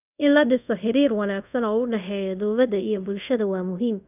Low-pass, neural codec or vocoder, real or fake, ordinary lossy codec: 3.6 kHz; codec, 24 kHz, 0.5 kbps, DualCodec; fake; none